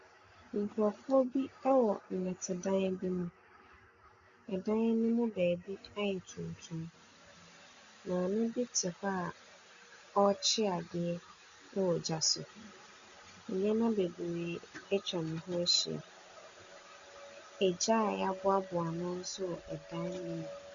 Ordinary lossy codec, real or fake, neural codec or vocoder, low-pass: Opus, 64 kbps; real; none; 7.2 kHz